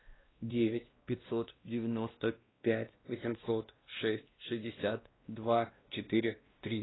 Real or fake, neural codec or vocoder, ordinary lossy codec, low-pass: fake; codec, 16 kHz, 1 kbps, X-Codec, WavLM features, trained on Multilingual LibriSpeech; AAC, 16 kbps; 7.2 kHz